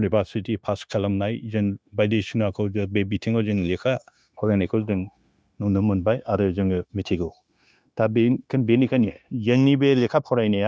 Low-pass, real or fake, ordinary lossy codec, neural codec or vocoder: none; fake; none; codec, 16 kHz, 0.9 kbps, LongCat-Audio-Codec